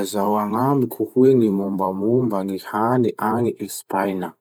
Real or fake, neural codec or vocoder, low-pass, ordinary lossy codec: fake; vocoder, 44.1 kHz, 128 mel bands every 512 samples, BigVGAN v2; none; none